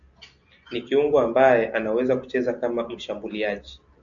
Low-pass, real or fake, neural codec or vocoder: 7.2 kHz; real; none